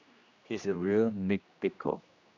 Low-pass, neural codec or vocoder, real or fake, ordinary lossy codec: 7.2 kHz; codec, 16 kHz, 1 kbps, X-Codec, HuBERT features, trained on general audio; fake; none